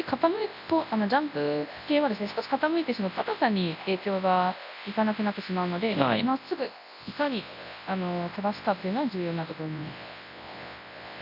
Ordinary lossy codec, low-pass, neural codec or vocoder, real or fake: none; 5.4 kHz; codec, 24 kHz, 0.9 kbps, WavTokenizer, large speech release; fake